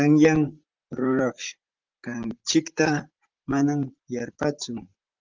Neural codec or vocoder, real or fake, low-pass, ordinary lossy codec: vocoder, 44.1 kHz, 128 mel bands, Pupu-Vocoder; fake; 7.2 kHz; Opus, 24 kbps